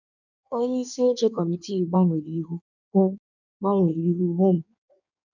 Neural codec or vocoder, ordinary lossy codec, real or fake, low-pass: codec, 16 kHz in and 24 kHz out, 1.1 kbps, FireRedTTS-2 codec; none; fake; 7.2 kHz